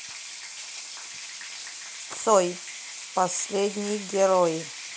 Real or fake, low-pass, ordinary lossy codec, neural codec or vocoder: real; none; none; none